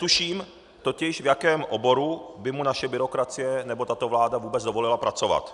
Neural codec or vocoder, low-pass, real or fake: none; 10.8 kHz; real